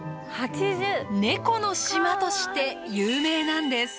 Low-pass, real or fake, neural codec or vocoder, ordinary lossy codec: none; real; none; none